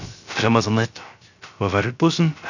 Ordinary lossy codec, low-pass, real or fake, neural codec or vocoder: none; 7.2 kHz; fake; codec, 16 kHz, 0.3 kbps, FocalCodec